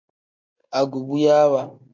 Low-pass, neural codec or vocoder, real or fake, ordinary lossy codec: 7.2 kHz; none; real; MP3, 48 kbps